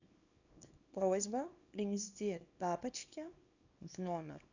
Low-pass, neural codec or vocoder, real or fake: 7.2 kHz; codec, 24 kHz, 0.9 kbps, WavTokenizer, small release; fake